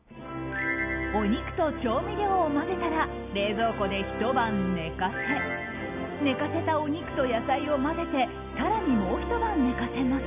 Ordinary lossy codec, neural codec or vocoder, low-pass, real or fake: none; none; 3.6 kHz; real